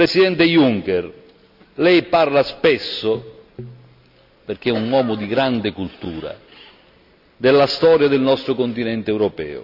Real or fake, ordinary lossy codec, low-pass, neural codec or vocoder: real; none; 5.4 kHz; none